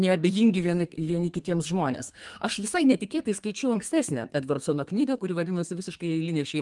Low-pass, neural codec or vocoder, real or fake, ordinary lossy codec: 10.8 kHz; codec, 32 kHz, 1.9 kbps, SNAC; fake; Opus, 32 kbps